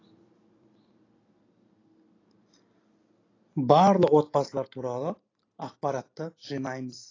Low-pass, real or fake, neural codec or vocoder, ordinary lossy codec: 7.2 kHz; real; none; AAC, 32 kbps